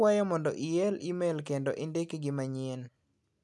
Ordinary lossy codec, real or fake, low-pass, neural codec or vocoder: none; real; none; none